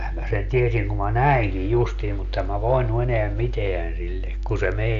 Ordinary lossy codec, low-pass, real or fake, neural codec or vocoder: none; 7.2 kHz; real; none